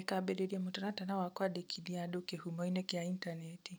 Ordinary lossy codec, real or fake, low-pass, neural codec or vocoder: none; real; none; none